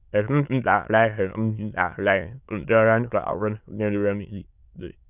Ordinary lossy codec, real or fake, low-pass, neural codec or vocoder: none; fake; 3.6 kHz; autoencoder, 22.05 kHz, a latent of 192 numbers a frame, VITS, trained on many speakers